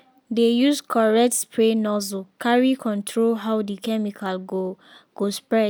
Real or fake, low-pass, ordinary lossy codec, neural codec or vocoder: real; none; none; none